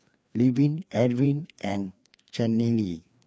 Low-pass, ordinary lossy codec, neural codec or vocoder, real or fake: none; none; codec, 16 kHz, 2 kbps, FreqCodec, larger model; fake